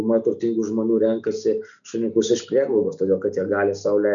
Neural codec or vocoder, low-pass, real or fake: none; 7.2 kHz; real